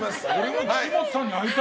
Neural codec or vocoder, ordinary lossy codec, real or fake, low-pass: none; none; real; none